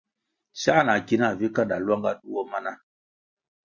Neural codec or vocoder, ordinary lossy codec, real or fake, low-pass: none; Opus, 64 kbps; real; 7.2 kHz